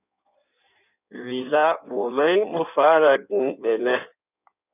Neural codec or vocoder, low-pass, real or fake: codec, 16 kHz in and 24 kHz out, 1.1 kbps, FireRedTTS-2 codec; 3.6 kHz; fake